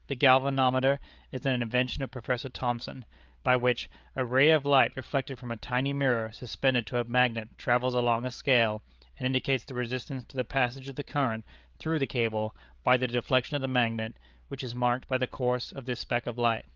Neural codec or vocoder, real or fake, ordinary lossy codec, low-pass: codec, 16 kHz, 8 kbps, FreqCodec, larger model; fake; Opus, 24 kbps; 7.2 kHz